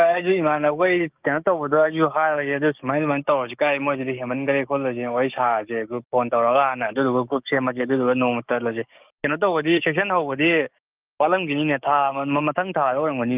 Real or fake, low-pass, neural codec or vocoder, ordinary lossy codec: fake; 3.6 kHz; codec, 16 kHz, 6 kbps, DAC; Opus, 24 kbps